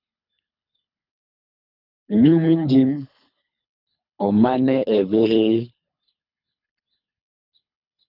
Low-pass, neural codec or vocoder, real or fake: 5.4 kHz; codec, 24 kHz, 3 kbps, HILCodec; fake